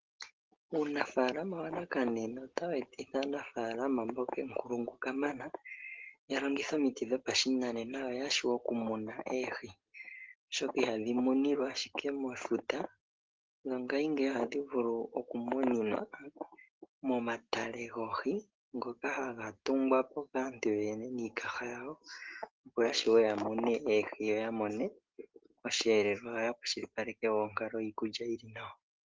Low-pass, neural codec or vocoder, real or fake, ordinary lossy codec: 7.2 kHz; none; real; Opus, 16 kbps